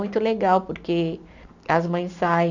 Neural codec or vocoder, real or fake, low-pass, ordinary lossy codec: none; real; 7.2 kHz; none